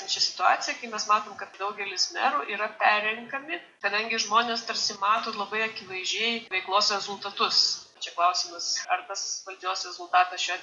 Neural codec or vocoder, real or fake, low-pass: none; real; 10.8 kHz